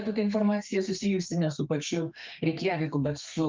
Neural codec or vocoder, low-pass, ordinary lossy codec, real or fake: codec, 32 kHz, 1.9 kbps, SNAC; 7.2 kHz; Opus, 24 kbps; fake